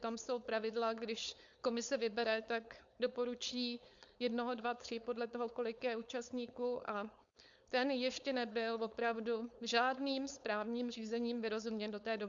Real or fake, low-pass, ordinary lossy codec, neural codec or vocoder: fake; 7.2 kHz; Opus, 64 kbps; codec, 16 kHz, 4.8 kbps, FACodec